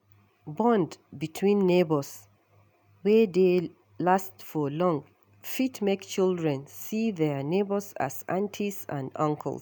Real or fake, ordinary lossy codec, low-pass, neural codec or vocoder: real; none; none; none